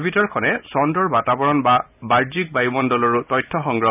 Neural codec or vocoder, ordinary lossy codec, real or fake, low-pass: none; none; real; 3.6 kHz